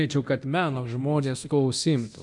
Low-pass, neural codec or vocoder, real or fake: 10.8 kHz; codec, 24 kHz, 0.9 kbps, DualCodec; fake